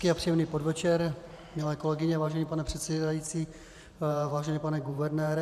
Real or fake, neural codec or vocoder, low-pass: fake; vocoder, 44.1 kHz, 128 mel bands every 512 samples, BigVGAN v2; 14.4 kHz